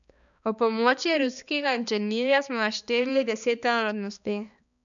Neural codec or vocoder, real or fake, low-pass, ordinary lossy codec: codec, 16 kHz, 2 kbps, X-Codec, HuBERT features, trained on balanced general audio; fake; 7.2 kHz; none